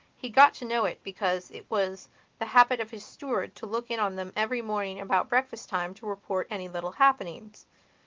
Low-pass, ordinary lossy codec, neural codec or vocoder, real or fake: 7.2 kHz; Opus, 32 kbps; none; real